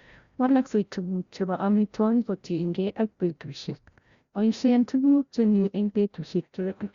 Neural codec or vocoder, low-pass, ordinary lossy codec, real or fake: codec, 16 kHz, 0.5 kbps, FreqCodec, larger model; 7.2 kHz; Opus, 64 kbps; fake